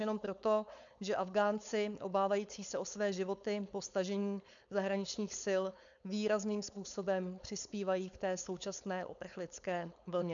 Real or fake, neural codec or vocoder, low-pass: fake; codec, 16 kHz, 4.8 kbps, FACodec; 7.2 kHz